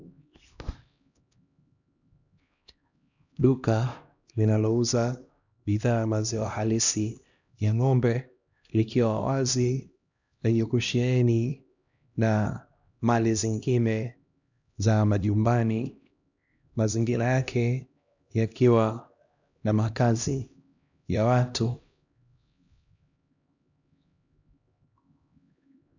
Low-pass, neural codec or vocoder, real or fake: 7.2 kHz; codec, 16 kHz, 1 kbps, X-Codec, HuBERT features, trained on LibriSpeech; fake